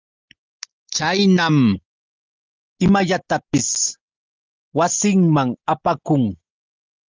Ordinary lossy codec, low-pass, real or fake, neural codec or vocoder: Opus, 32 kbps; 7.2 kHz; real; none